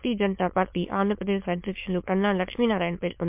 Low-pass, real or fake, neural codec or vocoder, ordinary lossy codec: 3.6 kHz; fake; autoencoder, 22.05 kHz, a latent of 192 numbers a frame, VITS, trained on many speakers; MP3, 32 kbps